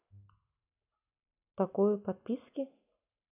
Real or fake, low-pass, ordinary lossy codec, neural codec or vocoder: real; 3.6 kHz; none; none